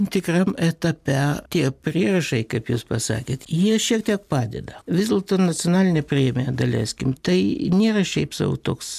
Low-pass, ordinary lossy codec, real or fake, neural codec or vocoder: 14.4 kHz; MP3, 96 kbps; real; none